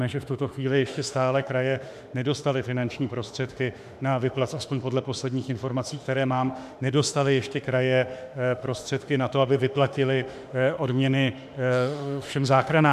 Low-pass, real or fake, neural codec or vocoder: 14.4 kHz; fake; autoencoder, 48 kHz, 32 numbers a frame, DAC-VAE, trained on Japanese speech